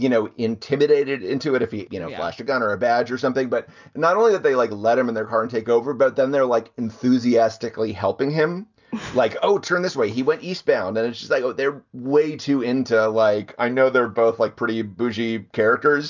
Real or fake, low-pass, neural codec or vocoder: real; 7.2 kHz; none